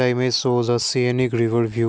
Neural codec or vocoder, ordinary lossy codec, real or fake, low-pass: none; none; real; none